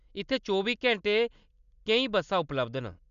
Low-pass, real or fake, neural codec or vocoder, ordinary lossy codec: 7.2 kHz; real; none; none